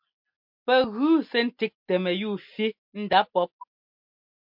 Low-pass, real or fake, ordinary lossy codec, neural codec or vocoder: 5.4 kHz; real; MP3, 48 kbps; none